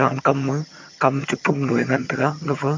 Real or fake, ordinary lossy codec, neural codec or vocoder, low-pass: fake; MP3, 48 kbps; vocoder, 22.05 kHz, 80 mel bands, HiFi-GAN; 7.2 kHz